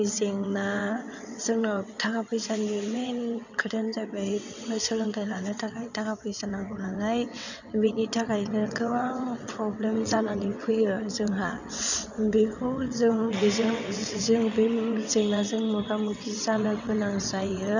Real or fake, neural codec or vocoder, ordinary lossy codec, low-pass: fake; vocoder, 22.05 kHz, 80 mel bands, WaveNeXt; none; 7.2 kHz